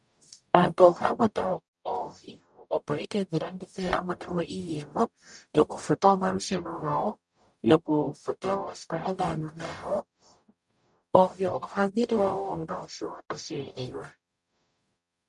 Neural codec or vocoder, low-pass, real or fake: codec, 44.1 kHz, 0.9 kbps, DAC; 10.8 kHz; fake